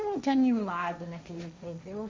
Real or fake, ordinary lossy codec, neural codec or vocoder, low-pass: fake; none; codec, 16 kHz, 1.1 kbps, Voila-Tokenizer; none